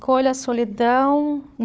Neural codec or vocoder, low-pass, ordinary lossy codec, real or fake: codec, 16 kHz, 4 kbps, FunCodec, trained on Chinese and English, 50 frames a second; none; none; fake